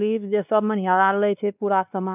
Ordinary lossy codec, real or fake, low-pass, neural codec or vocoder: none; fake; 3.6 kHz; codec, 16 kHz, 2 kbps, X-Codec, WavLM features, trained on Multilingual LibriSpeech